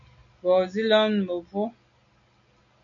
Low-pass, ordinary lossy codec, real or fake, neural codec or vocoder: 7.2 kHz; MP3, 96 kbps; real; none